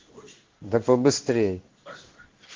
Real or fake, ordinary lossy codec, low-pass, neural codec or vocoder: fake; Opus, 16 kbps; 7.2 kHz; codec, 16 kHz in and 24 kHz out, 1 kbps, XY-Tokenizer